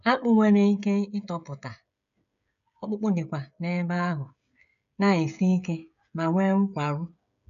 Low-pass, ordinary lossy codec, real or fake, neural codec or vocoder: 7.2 kHz; none; fake; codec, 16 kHz, 16 kbps, FreqCodec, smaller model